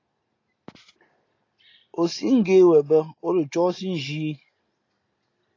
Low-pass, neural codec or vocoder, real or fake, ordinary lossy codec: 7.2 kHz; none; real; AAC, 32 kbps